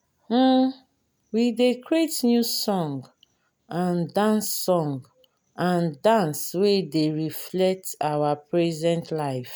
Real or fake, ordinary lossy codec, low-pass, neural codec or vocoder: real; none; none; none